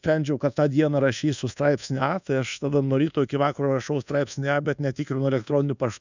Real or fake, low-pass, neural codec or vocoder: fake; 7.2 kHz; codec, 24 kHz, 1.2 kbps, DualCodec